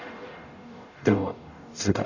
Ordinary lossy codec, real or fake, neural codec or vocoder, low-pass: none; fake; codec, 44.1 kHz, 0.9 kbps, DAC; 7.2 kHz